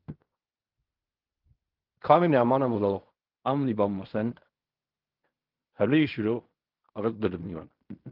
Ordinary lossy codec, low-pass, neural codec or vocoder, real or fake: Opus, 24 kbps; 5.4 kHz; codec, 16 kHz in and 24 kHz out, 0.4 kbps, LongCat-Audio-Codec, fine tuned four codebook decoder; fake